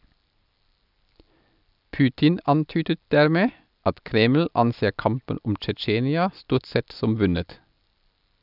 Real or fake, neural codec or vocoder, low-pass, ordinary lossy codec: real; none; 5.4 kHz; none